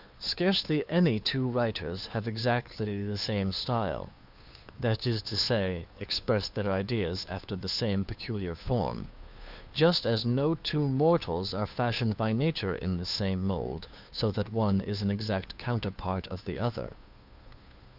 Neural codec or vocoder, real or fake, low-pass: codec, 16 kHz, 2 kbps, FunCodec, trained on Chinese and English, 25 frames a second; fake; 5.4 kHz